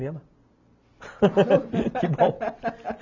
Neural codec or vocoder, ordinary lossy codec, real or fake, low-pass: none; none; real; 7.2 kHz